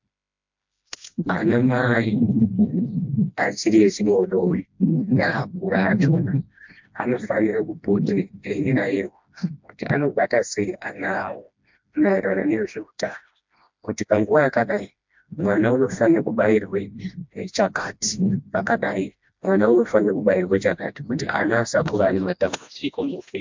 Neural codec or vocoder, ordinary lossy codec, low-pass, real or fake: codec, 16 kHz, 1 kbps, FreqCodec, smaller model; MP3, 64 kbps; 7.2 kHz; fake